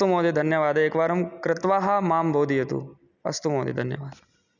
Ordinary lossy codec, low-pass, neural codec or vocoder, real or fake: none; 7.2 kHz; none; real